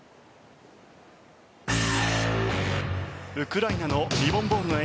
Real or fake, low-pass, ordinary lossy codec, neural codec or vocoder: real; none; none; none